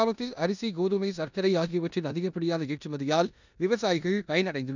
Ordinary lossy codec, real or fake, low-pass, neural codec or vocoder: none; fake; 7.2 kHz; codec, 16 kHz in and 24 kHz out, 0.9 kbps, LongCat-Audio-Codec, four codebook decoder